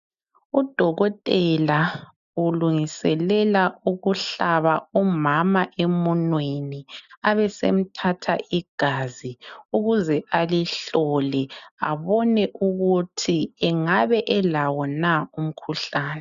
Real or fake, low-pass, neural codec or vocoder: real; 7.2 kHz; none